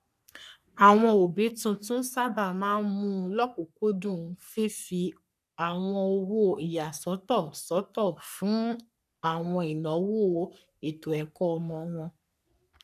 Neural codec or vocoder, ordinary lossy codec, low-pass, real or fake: codec, 44.1 kHz, 3.4 kbps, Pupu-Codec; none; 14.4 kHz; fake